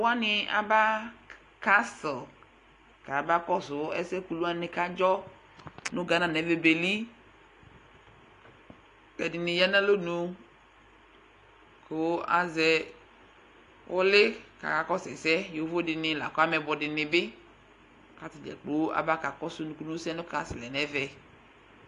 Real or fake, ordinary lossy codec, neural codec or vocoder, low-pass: real; AAC, 48 kbps; none; 10.8 kHz